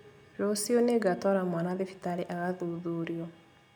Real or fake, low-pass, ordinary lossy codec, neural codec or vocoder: real; none; none; none